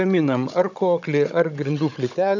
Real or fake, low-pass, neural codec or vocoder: fake; 7.2 kHz; codec, 16 kHz, 16 kbps, FunCodec, trained on Chinese and English, 50 frames a second